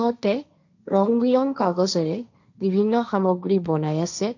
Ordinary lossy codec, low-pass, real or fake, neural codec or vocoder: none; 7.2 kHz; fake; codec, 16 kHz, 1.1 kbps, Voila-Tokenizer